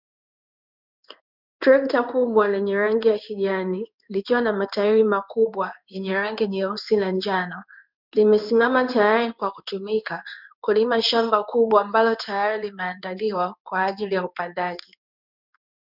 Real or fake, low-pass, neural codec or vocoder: fake; 5.4 kHz; codec, 16 kHz in and 24 kHz out, 1 kbps, XY-Tokenizer